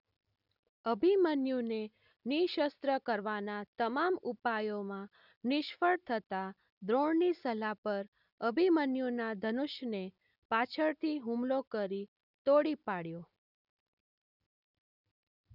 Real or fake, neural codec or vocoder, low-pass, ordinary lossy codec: real; none; 5.4 kHz; none